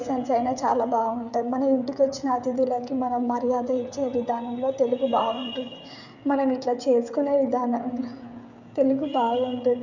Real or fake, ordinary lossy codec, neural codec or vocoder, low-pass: fake; none; codec, 16 kHz, 16 kbps, FreqCodec, smaller model; 7.2 kHz